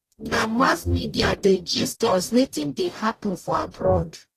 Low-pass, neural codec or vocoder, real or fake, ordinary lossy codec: 14.4 kHz; codec, 44.1 kHz, 0.9 kbps, DAC; fake; AAC, 48 kbps